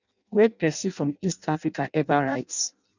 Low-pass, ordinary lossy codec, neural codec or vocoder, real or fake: 7.2 kHz; none; codec, 16 kHz in and 24 kHz out, 0.6 kbps, FireRedTTS-2 codec; fake